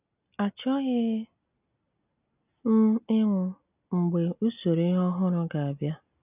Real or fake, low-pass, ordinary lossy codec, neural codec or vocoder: real; 3.6 kHz; none; none